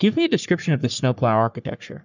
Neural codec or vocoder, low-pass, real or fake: codec, 44.1 kHz, 3.4 kbps, Pupu-Codec; 7.2 kHz; fake